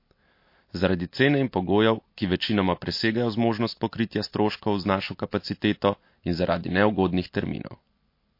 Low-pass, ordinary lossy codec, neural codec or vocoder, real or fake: 5.4 kHz; MP3, 32 kbps; none; real